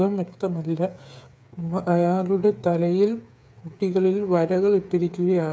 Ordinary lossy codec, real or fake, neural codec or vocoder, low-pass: none; fake; codec, 16 kHz, 8 kbps, FreqCodec, smaller model; none